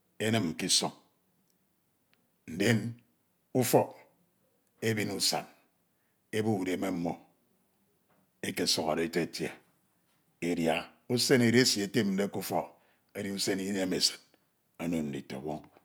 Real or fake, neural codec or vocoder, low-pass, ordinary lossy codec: real; none; none; none